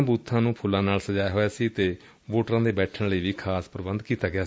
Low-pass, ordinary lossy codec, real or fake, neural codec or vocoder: none; none; real; none